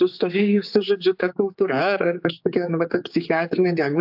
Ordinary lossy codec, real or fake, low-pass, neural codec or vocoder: AAC, 48 kbps; fake; 5.4 kHz; codec, 16 kHz, 4 kbps, X-Codec, HuBERT features, trained on general audio